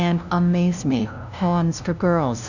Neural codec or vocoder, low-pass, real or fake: codec, 16 kHz, 0.5 kbps, FunCodec, trained on LibriTTS, 25 frames a second; 7.2 kHz; fake